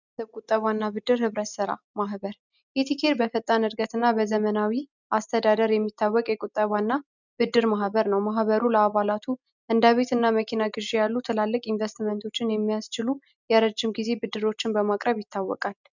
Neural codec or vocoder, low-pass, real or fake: none; 7.2 kHz; real